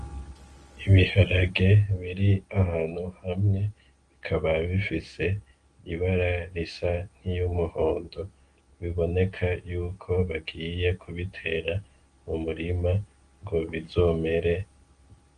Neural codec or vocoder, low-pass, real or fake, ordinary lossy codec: none; 9.9 kHz; real; Opus, 24 kbps